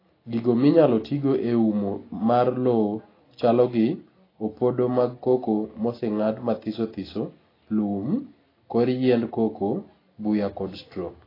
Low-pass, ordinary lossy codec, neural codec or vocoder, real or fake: 5.4 kHz; AAC, 24 kbps; none; real